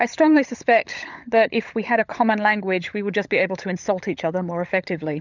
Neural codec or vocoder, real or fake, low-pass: codec, 16 kHz, 16 kbps, FunCodec, trained on LibriTTS, 50 frames a second; fake; 7.2 kHz